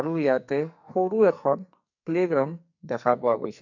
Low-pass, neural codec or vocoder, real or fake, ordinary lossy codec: 7.2 kHz; codec, 44.1 kHz, 2.6 kbps, SNAC; fake; none